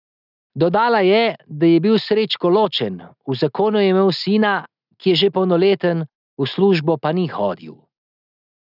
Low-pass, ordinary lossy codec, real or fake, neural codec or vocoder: 5.4 kHz; none; real; none